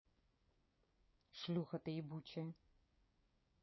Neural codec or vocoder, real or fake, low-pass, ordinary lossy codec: codec, 16 kHz, 6 kbps, DAC; fake; 7.2 kHz; MP3, 24 kbps